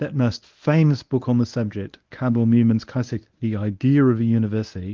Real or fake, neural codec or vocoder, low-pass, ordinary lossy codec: fake; codec, 24 kHz, 0.9 kbps, WavTokenizer, medium speech release version 1; 7.2 kHz; Opus, 32 kbps